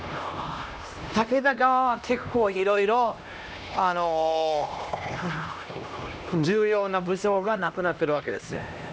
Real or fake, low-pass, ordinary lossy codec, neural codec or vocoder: fake; none; none; codec, 16 kHz, 1 kbps, X-Codec, HuBERT features, trained on LibriSpeech